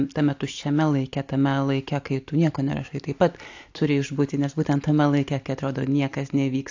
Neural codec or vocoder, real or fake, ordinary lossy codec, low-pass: none; real; AAC, 48 kbps; 7.2 kHz